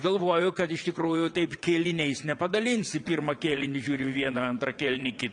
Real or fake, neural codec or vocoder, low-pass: fake; vocoder, 22.05 kHz, 80 mel bands, WaveNeXt; 9.9 kHz